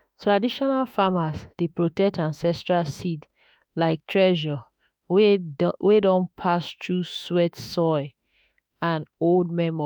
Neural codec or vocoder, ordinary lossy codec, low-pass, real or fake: autoencoder, 48 kHz, 32 numbers a frame, DAC-VAE, trained on Japanese speech; none; none; fake